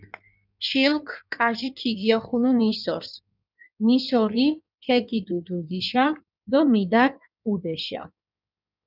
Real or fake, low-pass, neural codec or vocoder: fake; 5.4 kHz; codec, 16 kHz in and 24 kHz out, 1.1 kbps, FireRedTTS-2 codec